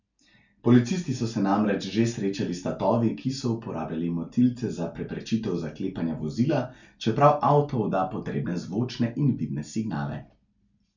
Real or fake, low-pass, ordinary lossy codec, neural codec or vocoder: real; 7.2 kHz; none; none